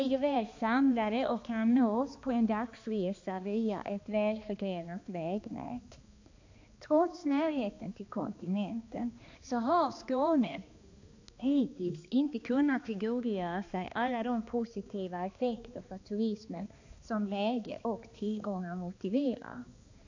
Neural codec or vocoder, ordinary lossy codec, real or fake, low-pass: codec, 16 kHz, 2 kbps, X-Codec, HuBERT features, trained on balanced general audio; AAC, 48 kbps; fake; 7.2 kHz